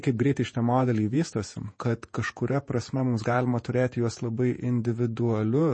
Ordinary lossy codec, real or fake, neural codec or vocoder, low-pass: MP3, 32 kbps; fake; vocoder, 48 kHz, 128 mel bands, Vocos; 9.9 kHz